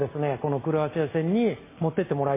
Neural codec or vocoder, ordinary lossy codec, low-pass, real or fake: none; MP3, 16 kbps; 3.6 kHz; real